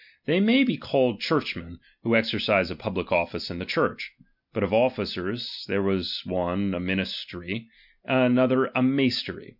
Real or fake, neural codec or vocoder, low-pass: real; none; 5.4 kHz